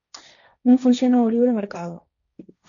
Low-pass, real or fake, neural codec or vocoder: 7.2 kHz; fake; codec, 16 kHz, 1.1 kbps, Voila-Tokenizer